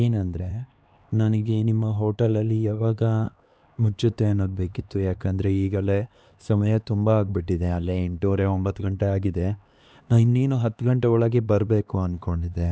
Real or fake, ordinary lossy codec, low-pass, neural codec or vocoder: fake; none; none; codec, 16 kHz, 2 kbps, X-Codec, HuBERT features, trained on LibriSpeech